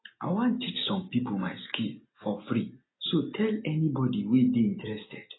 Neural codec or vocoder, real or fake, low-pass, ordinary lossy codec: none; real; 7.2 kHz; AAC, 16 kbps